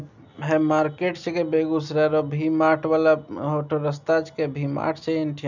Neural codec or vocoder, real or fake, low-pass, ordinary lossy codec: none; real; 7.2 kHz; none